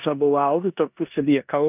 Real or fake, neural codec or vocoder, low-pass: fake; codec, 16 kHz in and 24 kHz out, 0.9 kbps, LongCat-Audio-Codec, four codebook decoder; 3.6 kHz